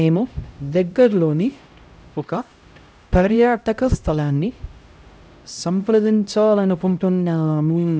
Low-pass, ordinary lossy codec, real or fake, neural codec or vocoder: none; none; fake; codec, 16 kHz, 0.5 kbps, X-Codec, HuBERT features, trained on LibriSpeech